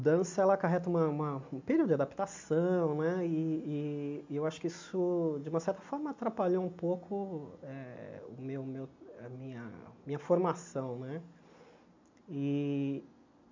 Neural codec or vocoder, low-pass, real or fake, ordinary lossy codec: none; 7.2 kHz; real; MP3, 64 kbps